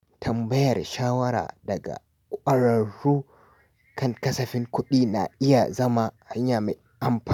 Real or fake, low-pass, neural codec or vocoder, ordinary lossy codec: real; 19.8 kHz; none; none